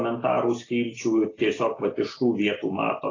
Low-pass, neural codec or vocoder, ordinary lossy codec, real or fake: 7.2 kHz; none; AAC, 32 kbps; real